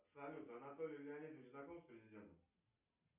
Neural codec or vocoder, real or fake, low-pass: none; real; 3.6 kHz